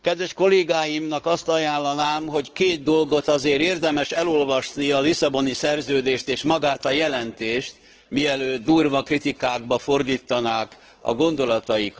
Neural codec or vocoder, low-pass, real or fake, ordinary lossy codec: codec, 16 kHz, 4 kbps, X-Codec, WavLM features, trained on Multilingual LibriSpeech; 7.2 kHz; fake; Opus, 16 kbps